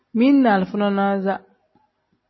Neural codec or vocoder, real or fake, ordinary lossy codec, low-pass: none; real; MP3, 24 kbps; 7.2 kHz